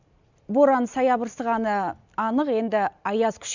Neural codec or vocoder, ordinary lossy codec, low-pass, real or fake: none; none; 7.2 kHz; real